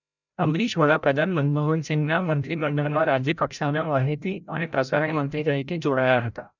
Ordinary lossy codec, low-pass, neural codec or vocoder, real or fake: none; 7.2 kHz; codec, 16 kHz, 0.5 kbps, FreqCodec, larger model; fake